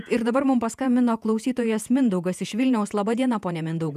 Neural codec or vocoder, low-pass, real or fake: vocoder, 48 kHz, 128 mel bands, Vocos; 14.4 kHz; fake